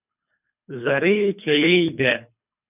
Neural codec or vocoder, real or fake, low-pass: codec, 24 kHz, 3 kbps, HILCodec; fake; 3.6 kHz